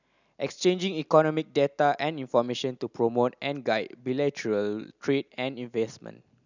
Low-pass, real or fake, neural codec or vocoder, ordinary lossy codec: 7.2 kHz; real; none; none